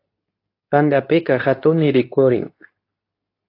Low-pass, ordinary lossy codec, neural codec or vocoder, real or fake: 5.4 kHz; AAC, 32 kbps; codec, 24 kHz, 0.9 kbps, WavTokenizer, medium speech release version 2; fake